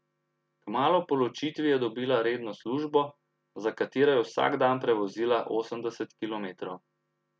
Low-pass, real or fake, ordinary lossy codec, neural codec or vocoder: none; real; none; none